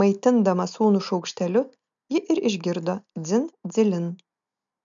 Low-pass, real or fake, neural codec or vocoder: 7.2 kHz; real; none